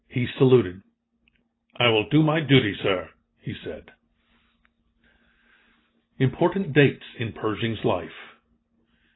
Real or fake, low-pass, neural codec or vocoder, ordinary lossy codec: real; 7.2 kHz; none; AAC, 16 kbps